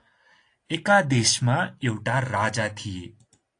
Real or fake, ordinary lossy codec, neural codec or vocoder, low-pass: real; AAC, 48 kbps; none; 9.9 kHz